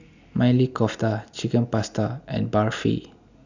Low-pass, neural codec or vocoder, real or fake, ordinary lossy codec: 7.2 kHz; none; real; none